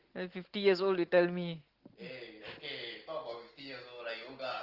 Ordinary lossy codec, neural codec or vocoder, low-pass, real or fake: Opus, 16 kbps; none; 5.4 kHz; real